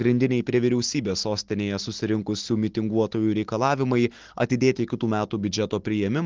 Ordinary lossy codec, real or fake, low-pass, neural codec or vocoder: Opus, 16 kbps; real; 7.2 kHz; none